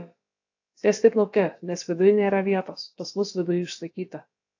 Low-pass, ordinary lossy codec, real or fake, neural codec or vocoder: 7.2 kHz; AAC, 48 kbps; fake; codec, 16 kHz, about 1 kbps, DyCAST, with the encoder's durations